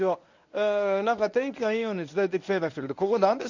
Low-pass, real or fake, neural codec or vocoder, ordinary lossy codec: 7.2 kHz; fake; codec, 24 kHz, 0.9 kbps, WavTokenizer, medium speech release version 1; none